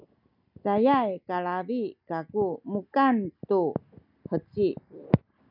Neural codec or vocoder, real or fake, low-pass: none; real; 5.4 kHz